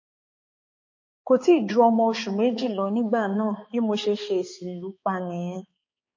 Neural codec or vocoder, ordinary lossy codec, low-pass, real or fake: codec, 16 kHz, 4 kbps, X-Codec, HuBERT features, trained on balanced general audio; MP3, 32 kbps; 7.2 kHz; fake